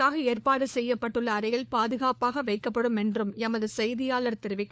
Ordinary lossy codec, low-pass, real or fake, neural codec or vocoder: none; none; fake; codec, 16 kHz, 4 kbps, FunCodec, trained on LibriTTS, 50 frames a second